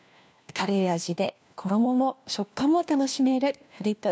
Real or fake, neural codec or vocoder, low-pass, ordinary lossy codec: fake; codec, 16 kHz, 1 kbps, FunCodec, trained on LibriTTS, 50 frames a second; none; none